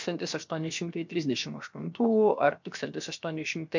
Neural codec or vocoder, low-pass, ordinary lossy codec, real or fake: codec, 16 kHz, 0.7 kbps, FocalCodec; 7.2 kHz; MP3, 64 kbps; fake